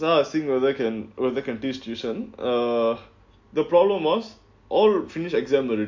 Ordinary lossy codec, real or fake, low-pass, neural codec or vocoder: MP3, 48 kbps; real; 7.2 kHz; none